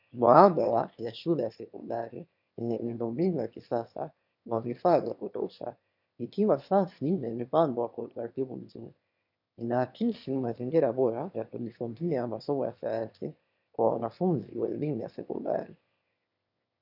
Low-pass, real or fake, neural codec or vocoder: 5.4 kHz; fake; autoencoder, 22.05 kHz, a latent of 192 numbers a frame, VITS, trained on one speaker